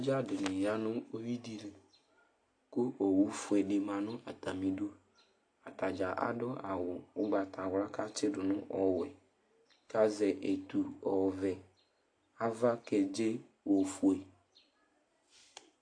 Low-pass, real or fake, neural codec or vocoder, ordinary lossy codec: 9.9 kHz; real; none; AAC, 48 kbps